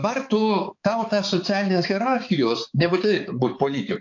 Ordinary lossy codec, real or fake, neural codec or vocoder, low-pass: AAC, 48 kbps; fake; codec, 16 kHz, 4 kbps, X-Codec, HuBERT features, trained on balanced general audio; 7.2 kHz